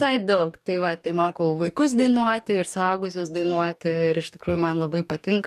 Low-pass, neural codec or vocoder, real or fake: 14.4 kHz; codec, 44.1 kHz, 2.6 kbps, DAC; fake